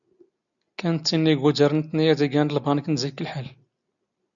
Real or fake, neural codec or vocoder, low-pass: real; none; 7.2 kHz